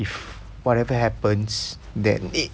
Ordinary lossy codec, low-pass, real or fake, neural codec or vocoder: none; none; real; none